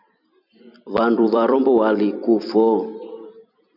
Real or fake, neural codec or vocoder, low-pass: fake; vocoder, 44.1 kHz, 128 mel bands every 256 samples, BigVGAN v2; 5.4 kHz